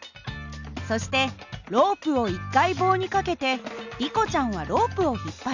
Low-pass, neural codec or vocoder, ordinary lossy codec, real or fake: 7.2 kHz; none; none; real